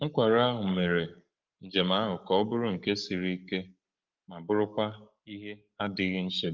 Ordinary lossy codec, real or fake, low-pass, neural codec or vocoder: Opus, 24 kbps; fake; 7.2 kHz; codec, 44.1 kHz, 7.8 kbps, Pupu-Codec